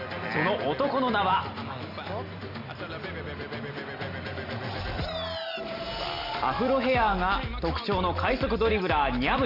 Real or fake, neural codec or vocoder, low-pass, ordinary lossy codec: real; none; 5.4 kHz; none